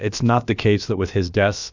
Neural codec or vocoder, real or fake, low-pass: codec, 16 kHz, about 1 kbps, DyCAST, with the encoder's durations; fake; 7.2 kHz